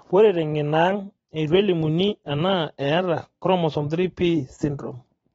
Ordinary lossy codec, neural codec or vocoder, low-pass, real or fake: AAC, 24 kbps; vocoder, 44.1 kHz, 128 mel bands every 512 samples, BigVGAN v2; 19.8 kHz; fake